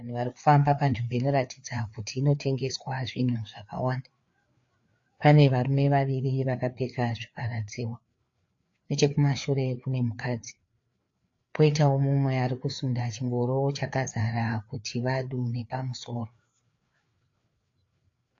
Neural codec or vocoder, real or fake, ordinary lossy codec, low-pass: codec, 16 kHz, 4 kbps, FreqCodec, larger model; fake; AAC, 48 kbps; 7.2 kHz